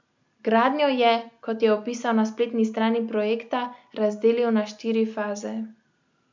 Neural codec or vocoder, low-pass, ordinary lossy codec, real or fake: none; 7.2 kHz; MP3, 64 kbps; real